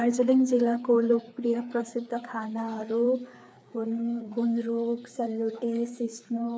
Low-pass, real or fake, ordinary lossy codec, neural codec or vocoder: none; fake; none; codec, 16 kHz, 4 kbps, FreqCodec, larger model